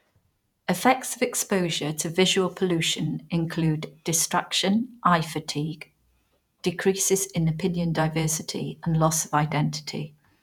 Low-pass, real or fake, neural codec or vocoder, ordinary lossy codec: 19.8 kHz; fake; vocoder, 48 kHz, 128 mel bands, Vocos; none